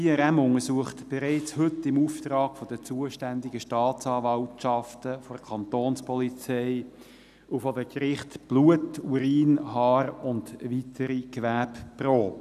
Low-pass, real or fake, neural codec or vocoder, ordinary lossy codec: 14.4 kHz; real; none; none